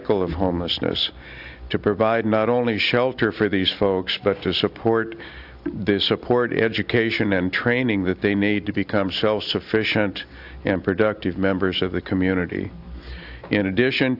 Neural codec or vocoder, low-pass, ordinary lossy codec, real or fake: none; 5.4 kHz; AAC, 48 kbps; real